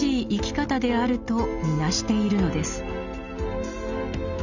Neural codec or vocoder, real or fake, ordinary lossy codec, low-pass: none; real; none; 7.2 kHz